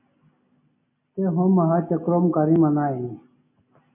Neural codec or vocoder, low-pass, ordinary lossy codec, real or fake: none; 3.6 kHz; MP3, 32 kbps; real